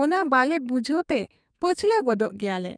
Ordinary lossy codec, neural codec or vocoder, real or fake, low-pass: none; codec, 32 kHz, 1.9 kbps, SNAC; fake; 9.9 kHz